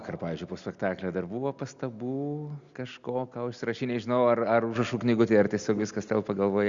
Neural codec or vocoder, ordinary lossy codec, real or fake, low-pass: none; Opus, 64 kbps; real; 7.2 kHz